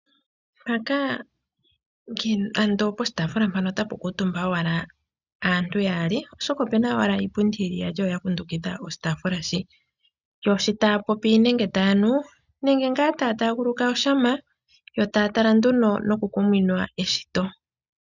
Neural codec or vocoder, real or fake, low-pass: none; real; 7.2 kHz